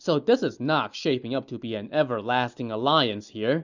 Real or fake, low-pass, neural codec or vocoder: real; 7.2 kHz; none